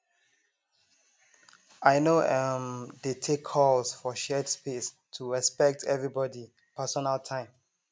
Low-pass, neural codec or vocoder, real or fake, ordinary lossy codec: none; none; real; none